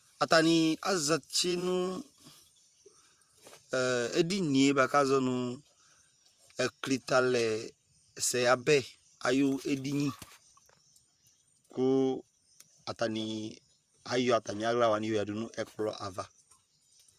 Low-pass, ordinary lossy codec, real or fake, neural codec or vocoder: 14.4 kHz; Opus, 64 kbps; fake; vocoder, 44.1 kHz, 128 mel bands, Pupu-Vocoder